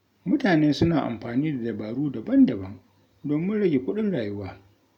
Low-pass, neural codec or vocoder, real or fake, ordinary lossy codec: 19.8 kHz; none; real; none